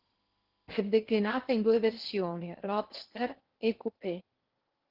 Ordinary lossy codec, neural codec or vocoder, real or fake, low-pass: Opus, 16 kbps; codec, 16 kHz in and 24 kHz out, 0.8 kbps, FocalCodec, streaming, 65536 codes; fake; 5.4 kHz